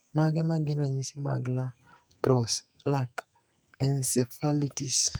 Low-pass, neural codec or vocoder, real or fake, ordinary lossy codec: none; codec, 44.1 kHz, 2.6 kbps, SNAC; fake; none